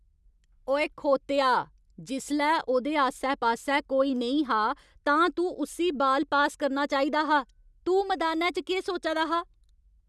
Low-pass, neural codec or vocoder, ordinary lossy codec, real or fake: none; none; none; real